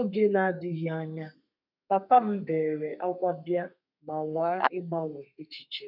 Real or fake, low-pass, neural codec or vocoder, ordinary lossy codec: fake; 5.4 kHz; codec, 32 kHz, 1.9 kbps, SNAC; none